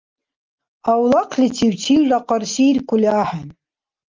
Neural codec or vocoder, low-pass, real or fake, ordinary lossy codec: none; 7.2 kHz; real; Opus, 24 kbps